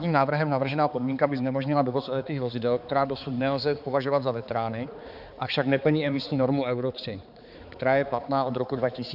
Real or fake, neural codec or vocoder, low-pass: fake; codec, 16 kHz, 4 kbps, X-Codec, HuBERT features, trained on balanced general audio; 5.4 kHz